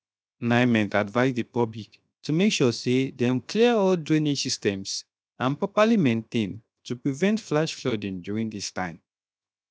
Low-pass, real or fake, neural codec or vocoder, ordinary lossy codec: none; fake; codec, 16 kHz, 0.7 kbps, FocalCodec; none